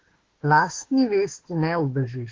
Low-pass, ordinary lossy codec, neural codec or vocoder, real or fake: 7.2 kHz; Opus, 16 kbps; autoencoder, 48 kHz, 32 numbers a frame, DAC-VAE, trained on Japanese speech; fake